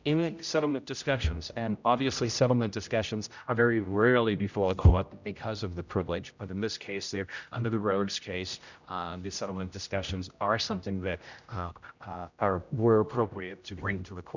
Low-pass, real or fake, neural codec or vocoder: 7.2 kHz; fake; codec, 16 kHz, 0.5 kbps, X-Codec, HuBERT features, trained on general audio